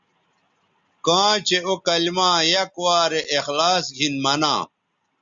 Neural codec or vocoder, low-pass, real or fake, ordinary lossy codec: none; 7.2 kHz; real; Opus, 64 kbps